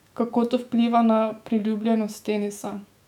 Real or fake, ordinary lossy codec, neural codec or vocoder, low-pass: fake; none; autoencoder, 48 kHz, 128 numbers a frame, DAC-VAE, trained on Japanese speech; 19.8 kHz